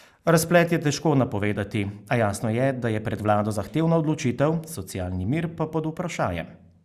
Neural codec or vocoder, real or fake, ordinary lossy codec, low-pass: none; real; Opus, 64 kbps; 14.4 kHz